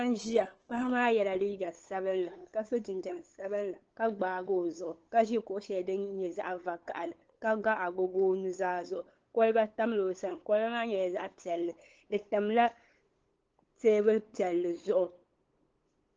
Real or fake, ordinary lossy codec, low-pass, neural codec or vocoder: fake; Opus, 16 kbps; 7.2 kHz; codec, 16 kHz, 8 kbps, FunCodec, trained on LibriTTS, 25 frames a second